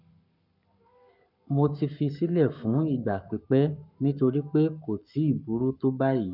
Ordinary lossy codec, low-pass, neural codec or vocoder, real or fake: none; 5.4 kHz; codec, 44.1 kHz, 7.8 kbps, Pupu-Codec; fake